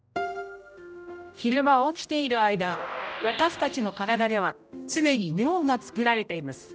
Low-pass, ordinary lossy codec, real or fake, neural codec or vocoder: none; none; fake; codec, 16 kHz, 0.5 kbps, X-Codec, HuBERT features, trained on general audio